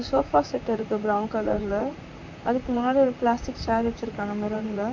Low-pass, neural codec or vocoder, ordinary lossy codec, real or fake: 7.2 kHz; vocoder, 44.1 kHz, 128 mel bands, Pupu-Vocoder; MP3, 48 kbps; fake